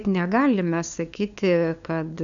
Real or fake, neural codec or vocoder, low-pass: fake; codec, 16 kHz, 2 kbps, FunCodec, trained on LibriTTS, 25 frames a second; 7.2 kHz